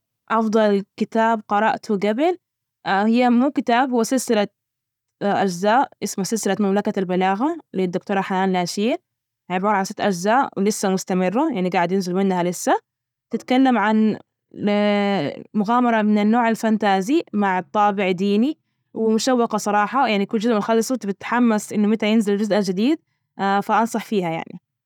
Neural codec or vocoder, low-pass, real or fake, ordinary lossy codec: none; 19.8 kHz; real; none